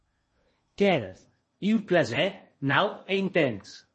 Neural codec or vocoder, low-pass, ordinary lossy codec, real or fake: codec, 16 kHz in and 24 kHz out, 0.8 kbps, FocalCodec, streaming, 65536 codes; 10.8 kHz; MP3, 32 kbps; fake